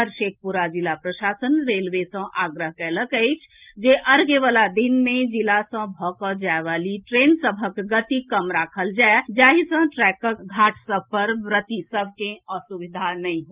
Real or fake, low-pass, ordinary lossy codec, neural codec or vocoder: real; 3.6 kHz; Opus, 24 kbps; none